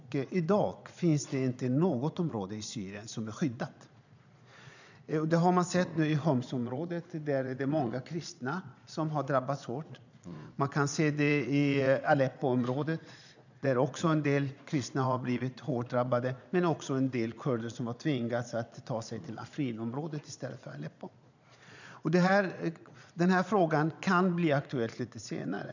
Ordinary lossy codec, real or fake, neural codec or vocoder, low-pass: none; fake; vocoder, 22.05 kHz, 80 mel bands, Vocos; 7.2 kHz